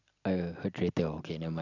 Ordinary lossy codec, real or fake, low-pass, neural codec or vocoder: none; real; 7.2 kHz; none